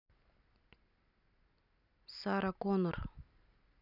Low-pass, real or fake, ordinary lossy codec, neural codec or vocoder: 5.4 kHz; real; none; none